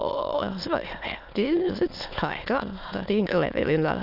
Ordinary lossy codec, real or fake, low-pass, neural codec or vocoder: none; fake; 5.4 kHz; autoencoder, 22.05 kHz, a latent of 192 numbers a frame, VITS, trained on many speakers